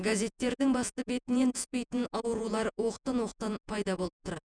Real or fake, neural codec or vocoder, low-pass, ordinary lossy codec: fake; vocoder, 48 kHz, 128 mel bands, Vocos; 9.9 kHz; none